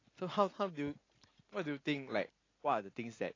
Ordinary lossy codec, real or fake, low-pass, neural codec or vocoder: AAC, 32 kbps; real; 7.2 kHz; none